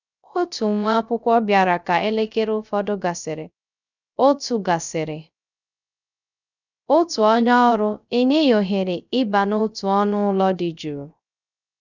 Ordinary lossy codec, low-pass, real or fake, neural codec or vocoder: none; 7.2 kHz; fake; codec, 16 kHz, 0.3 kbps, FocalCodec